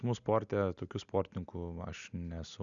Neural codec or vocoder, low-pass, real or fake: none; 7.2 kHz; real